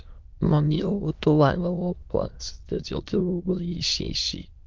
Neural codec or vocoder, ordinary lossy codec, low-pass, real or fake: autoencoder, 22.05 kHz, a latent of 192 numbers a frame, VITS, trained on many speakers; Opus, 24 kbps; 7.2 kHz; fake